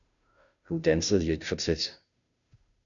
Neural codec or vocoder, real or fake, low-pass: codec, 16 kHz, 0.5 kbps, FunCodec, trained on Chinese and English, 25 frames a second; fake; 7.2 kHz